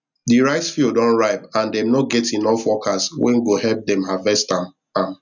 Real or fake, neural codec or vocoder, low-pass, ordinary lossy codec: real; none; 7.2 kHz; none